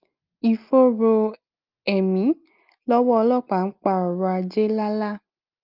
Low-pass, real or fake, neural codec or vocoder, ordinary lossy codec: 5.4 kHz; real; none; Opus, 32 kbps